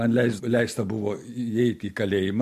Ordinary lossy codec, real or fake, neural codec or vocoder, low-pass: MP3, 64 kbps; real; none; 14.4 kHz